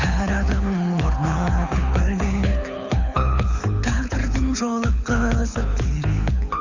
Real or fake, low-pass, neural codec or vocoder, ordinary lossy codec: fake; 7.2 kHz; codec, 24 kHz, 6 kbps, HILCodec; Opus, 64 kbps